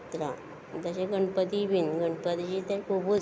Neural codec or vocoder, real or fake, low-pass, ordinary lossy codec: none; real; none; none